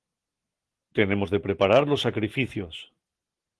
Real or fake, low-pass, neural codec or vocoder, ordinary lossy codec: real; 10.8 kHz; none; Opus, 16 kbps